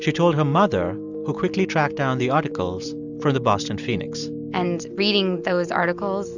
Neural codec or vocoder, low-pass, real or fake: none; 7.2 kHz; real